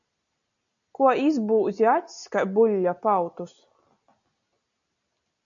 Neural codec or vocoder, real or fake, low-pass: none; real; 7.2 kHz